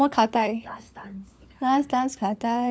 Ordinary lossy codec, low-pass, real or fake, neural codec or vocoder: none; none; fake; codec, 16 kHz, 4 kbps, FunCodec, trained on LibriTTS, 50 frames a second